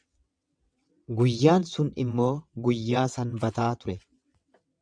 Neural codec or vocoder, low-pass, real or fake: vocoder, 22.05 kHz, 80 mel bands, WaveNeXt; 9.9 kHz; fake